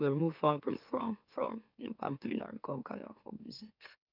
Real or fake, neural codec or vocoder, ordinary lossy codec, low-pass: fake; autoencoder, 44.1 kHz, a latent of 192 numbers a frame, MeloTTS; none; 5.4 kHz